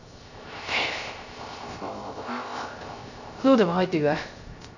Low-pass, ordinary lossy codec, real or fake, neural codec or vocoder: 7.2 kHz; none; fake; codec, 16 kHz, 0.3 kbps, FocalCodec